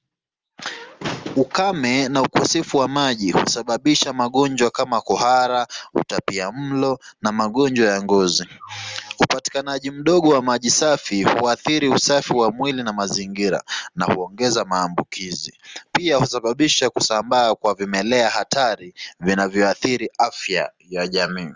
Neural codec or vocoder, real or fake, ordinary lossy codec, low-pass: none; real; Opus, 32 kbps; 7.2 kHz